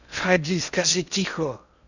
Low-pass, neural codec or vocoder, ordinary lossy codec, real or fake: 7.2 kHz; codec, 16 kHz in and 24 kHz out, 0.6 kbps, FocalCodec, streaming, 2048 codes; none; fake